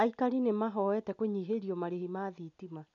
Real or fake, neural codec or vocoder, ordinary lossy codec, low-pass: real; none; none; 7.2 kHz